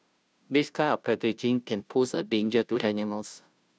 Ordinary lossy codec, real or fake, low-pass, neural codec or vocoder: none; fake; none; codec, 16 kHz, 0.5 kbps, FunCodec, trained on Chinese and English, 25 frames a second